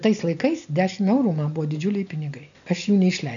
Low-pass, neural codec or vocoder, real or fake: 7.2 kHz; none; real